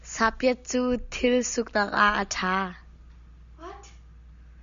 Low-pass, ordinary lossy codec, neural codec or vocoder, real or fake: 7.2 kHz; Opus, 64 kbps; none; real